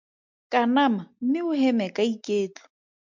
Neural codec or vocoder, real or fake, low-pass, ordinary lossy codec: none; real; 7.2 kHz; MP3, 64 kbps